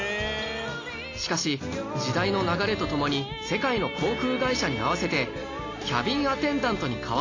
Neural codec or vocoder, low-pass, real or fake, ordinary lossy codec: none; 7.2 kHz; real; AAC, 32 kbps